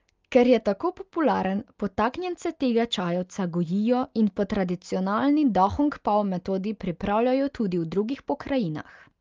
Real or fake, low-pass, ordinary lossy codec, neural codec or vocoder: real; 7.2 kHz; Opus, 32 kbps; none